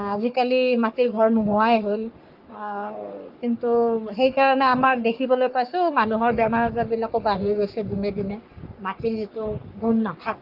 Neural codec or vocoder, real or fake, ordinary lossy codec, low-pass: codec, 44.1 kHz, 3.4 kbps, Pupu-Codec; fake; Opus, 32 kbps; 5.4 kHz